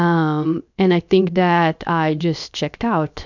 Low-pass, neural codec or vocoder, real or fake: 7.2 kHz; codec, 16 kHz, 0.7 kbps, FocalCodec; fake